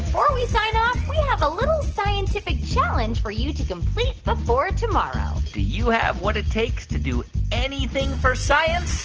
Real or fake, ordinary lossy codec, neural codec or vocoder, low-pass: real; Opus, 16 kbps; none; 7.2 kHz